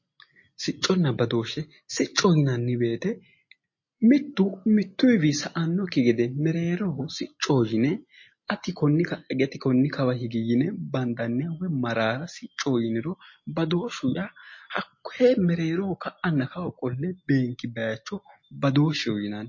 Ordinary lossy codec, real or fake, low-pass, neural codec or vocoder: MP3, 32 kbps; real; 7.2 kHz; none